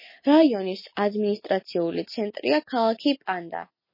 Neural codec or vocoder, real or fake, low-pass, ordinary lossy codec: none; real; 5.4 kHz; MP3, 24 kbps